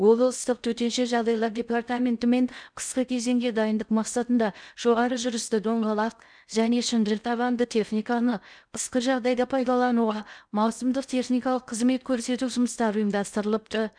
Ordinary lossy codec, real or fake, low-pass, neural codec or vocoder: none; fake; 9.9 kHz; codec, 16 kHz in and 24 kHz out, 0.6 kbps, FocalCodec, streaming, 2048 codes